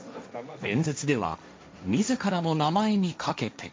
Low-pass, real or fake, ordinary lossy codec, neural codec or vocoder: none; fake; none; codec, 16 kHz, 1.1 kbps, Voila-Tokenizer